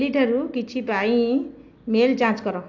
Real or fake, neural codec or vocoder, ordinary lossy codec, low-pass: real; none; AAC, 48 kbps; 7.2 kHz